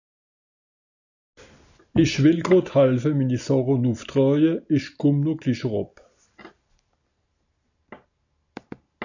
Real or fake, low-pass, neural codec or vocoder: real; 7.2 kHz; none